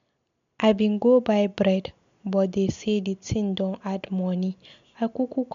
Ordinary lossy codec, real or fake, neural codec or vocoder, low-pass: MP3, 64 kbps; real; none; 7.2 kHz